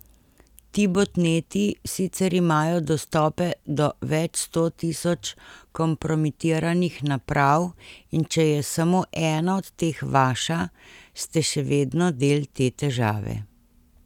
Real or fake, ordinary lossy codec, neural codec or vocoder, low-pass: real; none; none; 19.8 kHz